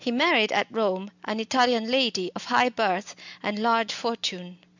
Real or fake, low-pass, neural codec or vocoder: real; 7.2 kHz; none